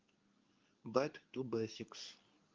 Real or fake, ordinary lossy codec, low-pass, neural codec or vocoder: fake; Opus, 24 kbps; 7.2 kHz; codec, 24 kHz, 0.9 kbps, WavTokenizer, medium speech release version 2